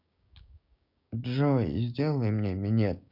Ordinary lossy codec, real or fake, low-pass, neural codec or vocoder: none; fake; 5.4 kHz; codec, 16 kHz, 6 kbps, DAC